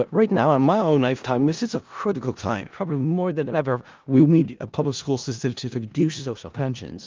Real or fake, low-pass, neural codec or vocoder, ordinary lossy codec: fake; 7.2 kHz; codec, 16 kHz in and 24 kHz out, 0.4 kbps, LongCat-Audio-Codec, four codebook decoder; Opus, 32 kbps